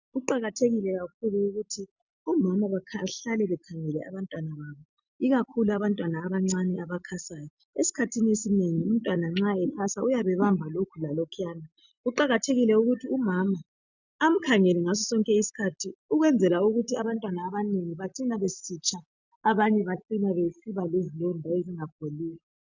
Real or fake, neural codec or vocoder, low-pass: real; none; 7.2 kHz